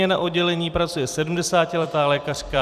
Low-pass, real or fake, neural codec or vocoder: 14.4 kHz; fake; vocoder, 44.1 kHz, 128 mel bands every 256 samples, BigVGAN v2